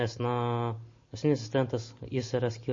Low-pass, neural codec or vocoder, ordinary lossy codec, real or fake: 7.2 kHz; none; MP3, 32 kbps; real